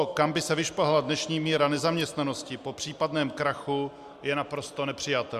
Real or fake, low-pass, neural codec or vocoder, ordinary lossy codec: real; 14.4 kHz; none; Opus, 64 kbps